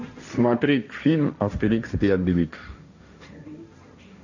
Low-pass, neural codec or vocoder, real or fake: 7.2 kHz; codec, 16 kHz, 1.1 kbps, Voila-Tokenizer; fake